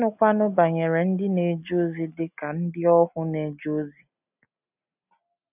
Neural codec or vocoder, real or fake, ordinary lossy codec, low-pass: none; real; none; 3.6 kHz